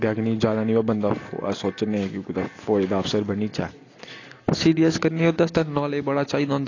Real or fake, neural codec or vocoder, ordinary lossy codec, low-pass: real; none; AAC, 32 kbps; 7.2 kHz